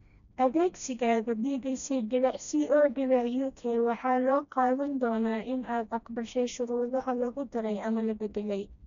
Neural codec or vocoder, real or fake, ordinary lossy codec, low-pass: codec, 16 kHz, 1 kbps, FreqCodec, smaller model; fake; none; 7.2 kHz